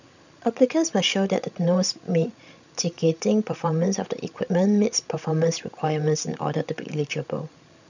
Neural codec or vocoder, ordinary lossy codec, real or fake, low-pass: codec, 16 kHz, 16 kbps, FreqCodec, larger model; none; fake; 7.2 kHz